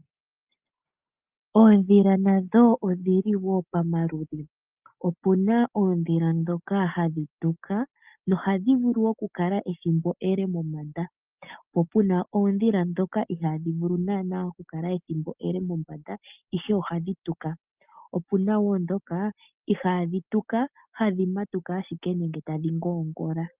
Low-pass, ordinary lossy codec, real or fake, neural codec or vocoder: 3.6 kHz; Opus, 24 kbps; real; none